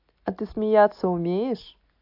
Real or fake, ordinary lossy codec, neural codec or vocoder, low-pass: real; AAC, 48 kbps; none; 5.4 kHz